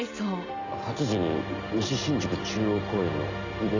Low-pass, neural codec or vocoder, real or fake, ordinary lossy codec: 7.2 kHz; none; real; none